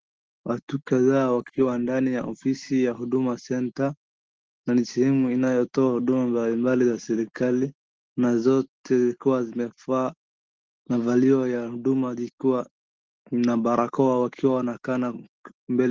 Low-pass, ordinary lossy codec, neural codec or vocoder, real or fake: 7.2 kHz; Opus, 16 kbps; none; real